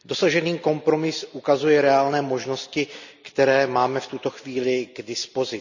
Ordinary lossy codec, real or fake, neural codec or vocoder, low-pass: none; real; none; 7.2 kHz